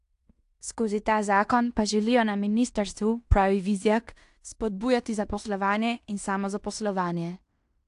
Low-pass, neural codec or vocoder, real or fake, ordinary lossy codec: 10.8 kHz; codec, 16 kHz in and 24 kHz out, 0.9 kbps, LongCat-Audio-Codec, fine tuned four codebook decoder; fake; AAC, 64 kbps